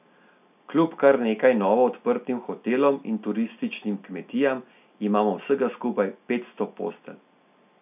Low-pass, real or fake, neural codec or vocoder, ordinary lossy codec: 3.6 kHz; real; none; none